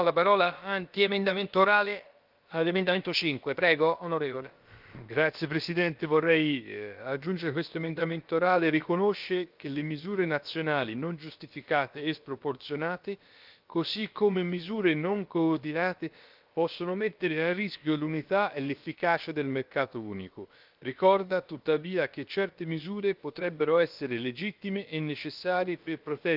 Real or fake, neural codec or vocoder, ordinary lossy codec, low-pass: fake; codec, 16 kHz, about 1 kbps, DyCAST, with the encoder's durations; Opus, 24 kbps; 5.4 kHz